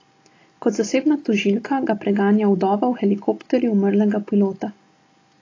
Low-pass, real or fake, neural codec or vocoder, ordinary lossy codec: 7.2 kHz; real; none; AAC, 32 kbps